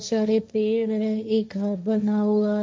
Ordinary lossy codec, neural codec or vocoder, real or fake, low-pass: none; codec, 16 kHz, 1.1 kbps, Voila-Tokenizer; fake; none